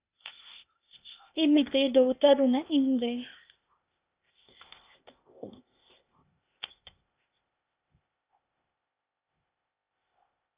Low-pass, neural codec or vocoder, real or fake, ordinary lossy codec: 3.6 kHz; codec, 16 kHz, 0.8 kbps, ZipCodec; fake; Opus, 64 kbps